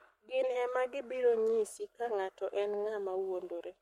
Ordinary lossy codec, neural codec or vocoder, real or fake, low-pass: MP3, 64 kbps; codec, 44.1 kHz, 7.8 kbps, Pupu-Codec; fake; 19.8 kHz